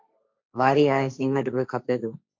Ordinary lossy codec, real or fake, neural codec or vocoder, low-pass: MP3, 48 kbps; fake; codec, 16 kHz, 1.1 kbps, Voila-Tokenizer; 7.2 kHz